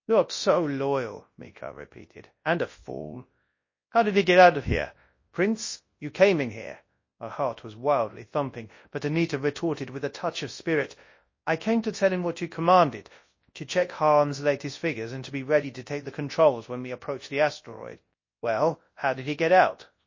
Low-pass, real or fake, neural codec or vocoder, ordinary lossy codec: 7.2 kHz; fake; codec, 24 kHz, 0.9 kbps, WavTokenizer, large speech release; MP3, 32 kbps